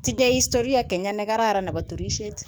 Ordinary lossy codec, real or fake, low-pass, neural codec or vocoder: none; fake; none; codec, 44.1 kHz, 7.8 kbps, DAC